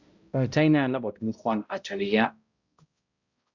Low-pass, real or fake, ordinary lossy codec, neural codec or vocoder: 7.2 kHz; fake; Opus, 64 kbps; codec, 16 kHz, 0.5 kbps, X-Codec, HuBERT features, trained on balanced general audio